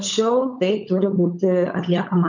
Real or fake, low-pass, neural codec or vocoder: fake; 7.2 kHz; codec, 16 kHz, 16 kbps, FunCodec, trained on LibriTTS, 50 frames a second